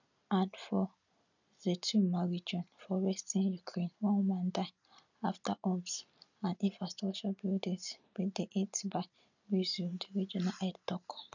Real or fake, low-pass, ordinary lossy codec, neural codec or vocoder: real; 7.2 kHz; none; none